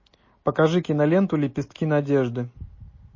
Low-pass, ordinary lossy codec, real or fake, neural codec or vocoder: 7.2 kHz; MP3, 32 kbps; real; none